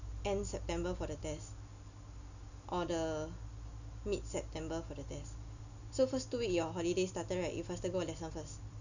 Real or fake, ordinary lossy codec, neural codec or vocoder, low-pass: real; none; none; 7.2 kHz